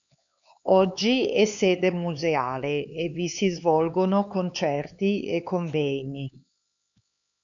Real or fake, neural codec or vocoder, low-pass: fake; codec, 16 kHz, 4 kbps, X-Codec, HuBERT features, trained on LibriSpeech; 7.2 kHz